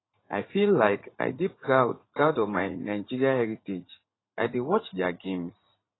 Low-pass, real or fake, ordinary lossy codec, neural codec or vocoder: 7.2 kHz; fake; AAC, 16 kbps; vocoder, 44.1 kHz, 80 mel bands, Vocos